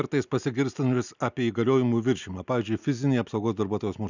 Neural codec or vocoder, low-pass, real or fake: none; 7.2 kHz; real